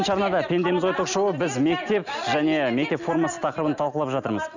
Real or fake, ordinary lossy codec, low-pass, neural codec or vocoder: real; none; 7.2 kHz; none